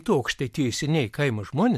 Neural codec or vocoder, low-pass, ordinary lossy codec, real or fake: vocoder, 44.1 kHz, 128 mel bands every 256 samples, BigVGAN v2; 14.4 kHz; MP3, 64 kbps; fake